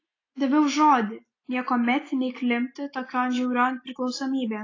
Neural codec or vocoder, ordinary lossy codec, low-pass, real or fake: none; AAC, 32 kbps; 7.2 kHz; real